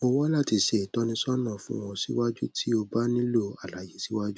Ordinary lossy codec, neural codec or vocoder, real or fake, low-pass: none; none; real; none